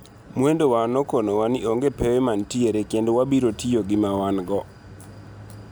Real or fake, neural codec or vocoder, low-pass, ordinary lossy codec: real; none; none; none